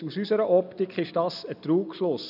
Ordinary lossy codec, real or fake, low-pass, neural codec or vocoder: none; real; 5.4 kHz; none